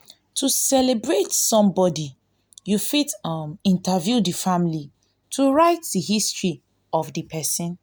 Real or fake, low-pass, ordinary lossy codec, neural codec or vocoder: real; none; none; none